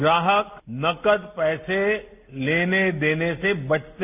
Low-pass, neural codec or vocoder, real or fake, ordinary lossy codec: 3.6 kHz; none; real; none